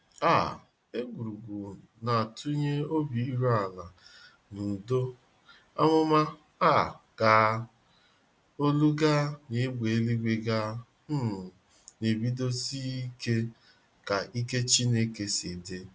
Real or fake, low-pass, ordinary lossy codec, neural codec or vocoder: real; none; none; none